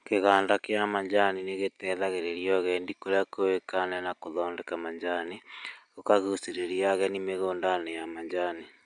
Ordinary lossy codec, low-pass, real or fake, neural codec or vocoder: none; 9.9 kHz; real; none